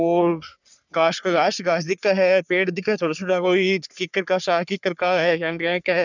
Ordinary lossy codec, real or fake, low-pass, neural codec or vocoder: none; fake; 7.2 kHz; codec, 44.1 kHz, 3.4 kbps, Pupu-Codec